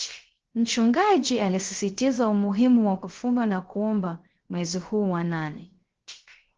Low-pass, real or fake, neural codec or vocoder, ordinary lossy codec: 7.2 kHz; fake; codec, 16 kHz, 0.3 kbps, FocalCodec; Opus, 16 kbps